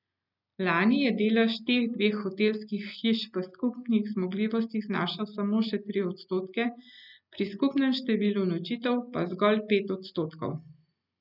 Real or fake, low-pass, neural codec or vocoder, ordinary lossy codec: real; 5.4 kHz; none; none